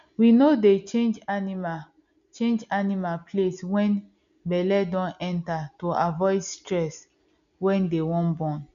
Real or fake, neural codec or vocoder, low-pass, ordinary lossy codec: real; none; 7.2 kHz; none